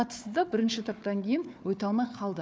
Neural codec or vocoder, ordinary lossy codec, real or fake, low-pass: codec, 16 kHz, 4 kbps, FunCodec, trained on Chinese and English, 50 frames a second; none; fake; none